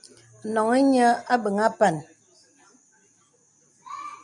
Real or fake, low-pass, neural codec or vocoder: real; 10.8 kHz; none